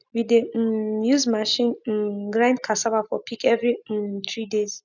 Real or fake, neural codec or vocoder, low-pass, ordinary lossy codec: real; none; 7.2 kHz; none